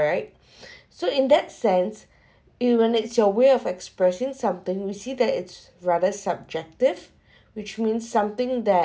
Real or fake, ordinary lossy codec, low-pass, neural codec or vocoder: real; none; none; none